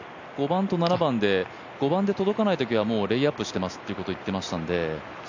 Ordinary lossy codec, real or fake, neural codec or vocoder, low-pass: none; real; none; 7.2 kHz